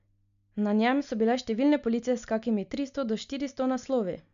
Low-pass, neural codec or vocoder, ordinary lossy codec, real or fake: 7.2 kHz; none; AAC, 96 kbps; real